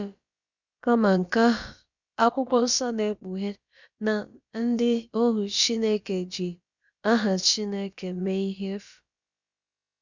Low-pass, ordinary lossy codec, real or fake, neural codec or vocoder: 7.2 kHz; Opus, 64 kbps; fake; codec, 16 kHz, about 1 kbps, DyCAST, with the encoder's durations